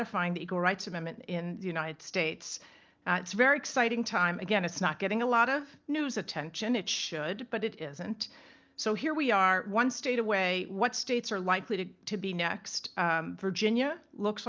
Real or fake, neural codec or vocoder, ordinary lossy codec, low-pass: real; none; Opus, 24 kbps; 7.2 kHz